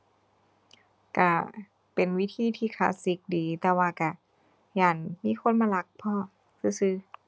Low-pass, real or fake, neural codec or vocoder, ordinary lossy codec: none; real; none; none